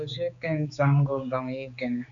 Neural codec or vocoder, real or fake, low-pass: codec, 16 kHz, 4 kbps, X-Codec, HuBERT features, trained on general audio; fake; 7.2 kHz